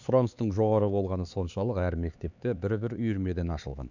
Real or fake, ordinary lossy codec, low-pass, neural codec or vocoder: fake; none; 7.2 kHz; codec, 16 kHz, 4 kbps, X-Codec, HuBERT features, trained on LibriSpeech